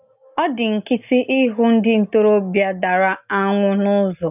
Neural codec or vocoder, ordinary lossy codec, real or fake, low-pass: none; none; real; 3.6 kHz